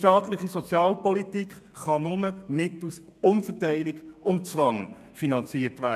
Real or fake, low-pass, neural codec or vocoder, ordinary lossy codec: fake; 14.4 kHz; codec, 32 kHz, 1.9 kbps, SNAC; none